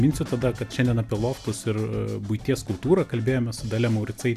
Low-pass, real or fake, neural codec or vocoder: 14.4 kHz; real; none